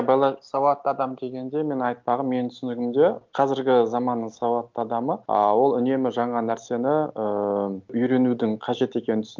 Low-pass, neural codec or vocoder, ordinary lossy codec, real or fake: 7.2 kHz; none; Opus, 24 kbps; real